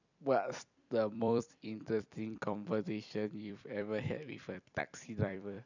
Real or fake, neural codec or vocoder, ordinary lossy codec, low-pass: fake; vocoder, 44.1 kHz, 128 mel bands every 256 samples, BigVGAN v2; none; 7.2 kHz